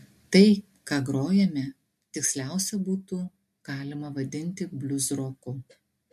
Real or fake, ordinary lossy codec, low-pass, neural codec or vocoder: real; MP3, 64 kbps; 14.4 kHz; none